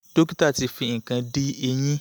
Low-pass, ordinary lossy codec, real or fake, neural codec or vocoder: none; none; real; none